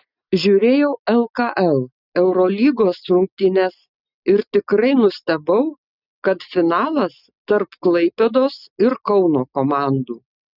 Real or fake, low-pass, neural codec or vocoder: real; 5.4 kHz; none